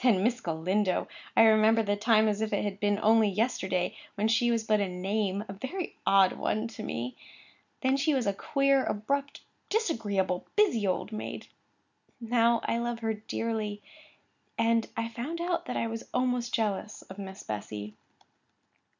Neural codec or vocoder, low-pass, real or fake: none; 7.2 kHz; real